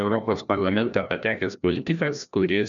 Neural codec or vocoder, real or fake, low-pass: codec, 16 kHz, 1 kbps, FreqCodec, larger model; fake; 7.2 kHz